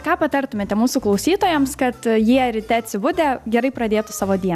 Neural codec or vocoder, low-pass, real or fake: none; 14.4 kHz; real